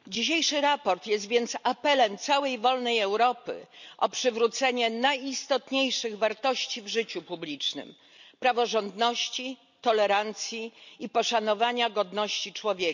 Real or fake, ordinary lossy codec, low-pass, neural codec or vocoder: real; none; 7.2 kHz; none